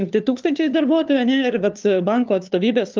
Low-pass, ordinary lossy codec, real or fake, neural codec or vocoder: 7.2 kHz; Opus, 24 kbps; fake; codec, 16 kHz, 2 kbps, FunCodec, trained on LibriTTS, 25 frames a second